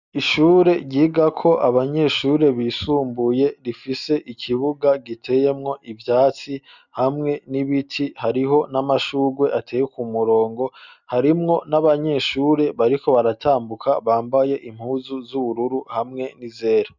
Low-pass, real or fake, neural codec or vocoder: 7.2 kHz; real; none